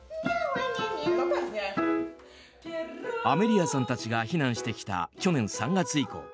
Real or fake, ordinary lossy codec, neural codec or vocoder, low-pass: real; none; none; none